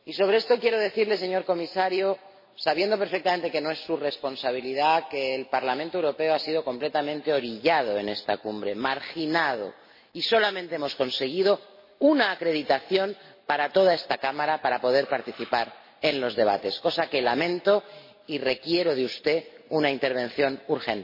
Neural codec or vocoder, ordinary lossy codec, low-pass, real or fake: none; MP3, 24 kbps; 5.4 kHz; real